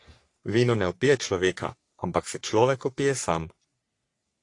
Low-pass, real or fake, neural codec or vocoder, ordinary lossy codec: 10.8 kHz; fake; codec, 44.1 kHz, 3.4 kbps, Pupu-Codec; AAC, 48 kbps